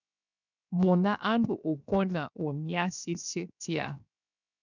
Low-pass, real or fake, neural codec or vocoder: 7.2 kHz; fake; codec, 16 kHz, 0.7 kbps, FocalCodec